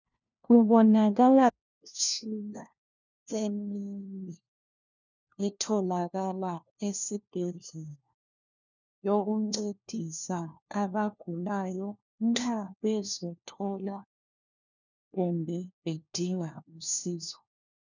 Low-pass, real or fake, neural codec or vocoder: 7.2 kHz; fake; codec, 16 kHz, 1 kbps, FunCodec, trained on LibriTTS, 50 frames a second